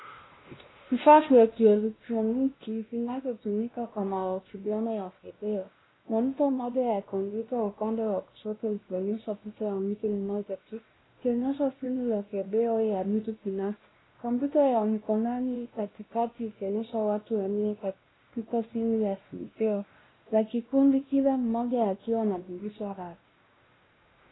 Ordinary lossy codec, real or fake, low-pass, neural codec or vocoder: AAC, 16 kbps; fake; 7.2 kHz; codec, 16 kHz, 1 kbps, X-Codec, WavLM features, trained on Multilingual LibriSpeech